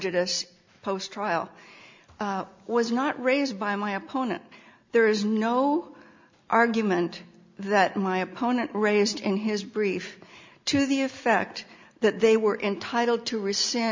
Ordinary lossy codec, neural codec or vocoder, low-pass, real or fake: MP3, 64 kbps; none; 7.2 kHz; real